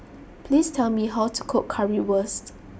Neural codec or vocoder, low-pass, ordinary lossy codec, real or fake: none; none; none; real